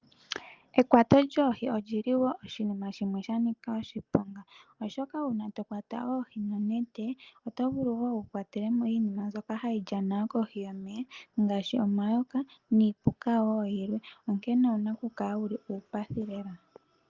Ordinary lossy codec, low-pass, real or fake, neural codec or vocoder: Opus, 32 kbps; 7.2 kHz; real; none